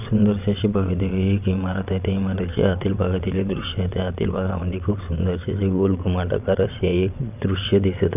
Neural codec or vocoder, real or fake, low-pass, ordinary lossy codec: vocoder, 22.05 kHz, 80 mel bands, WaveNeXt; fake; 3.6 kHz; none